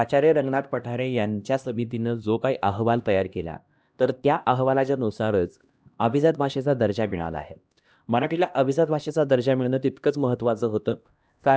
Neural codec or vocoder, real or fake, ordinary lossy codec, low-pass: codec, 16 kHz, 1 kbps, X-Codec, HuBERT features, trained on LibriSpeech; fake; none; none